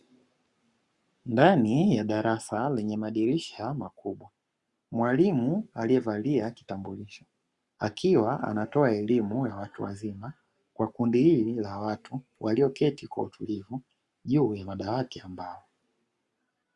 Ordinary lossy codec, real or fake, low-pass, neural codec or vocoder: Opus, 64 kbps; fake; 10.8 kHz; codec, 44.1 kHz, 7.8 kbps, Pupu-Codec